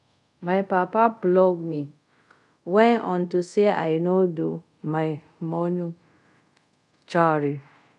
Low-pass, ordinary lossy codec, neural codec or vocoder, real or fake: 10.8 kHz; none; codec, 24 kHz, 0.5 kbps, DualCodec; fake